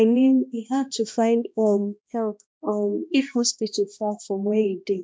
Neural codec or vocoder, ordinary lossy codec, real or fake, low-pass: codec, 16 kHz, 1 kbps, X-Codec, HuBERT features, trained on balanced general audio; none; fake; none